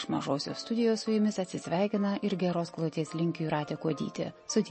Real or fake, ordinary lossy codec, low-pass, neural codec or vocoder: real; MP3, 32 kbps; 10.8 kHz; none